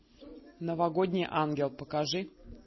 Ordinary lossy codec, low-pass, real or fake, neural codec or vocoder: MP3, 24 kbps; 7.2 kHz; real; none